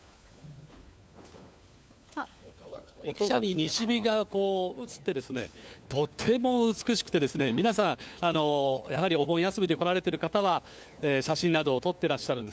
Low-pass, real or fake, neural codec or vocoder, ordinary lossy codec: none; fake; codec, 16 kHz, 4 kbps, FunCodec, trained on LibriTTS, 50 frames a second; none